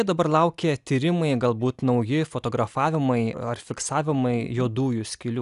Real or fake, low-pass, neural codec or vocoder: real; 10.8 kHz; none